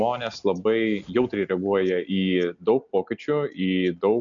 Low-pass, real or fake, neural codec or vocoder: 7.2 kHz; real; none